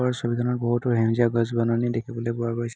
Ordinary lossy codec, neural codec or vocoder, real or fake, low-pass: none; none; real; none